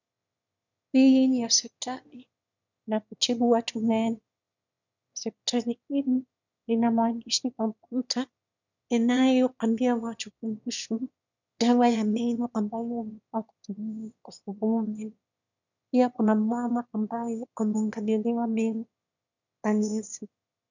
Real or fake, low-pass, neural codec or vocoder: fake; 7.2 kHz; autoencoder, 22.05 kHz, a latent of 192 numbers a frame, VITS, trained on one speaker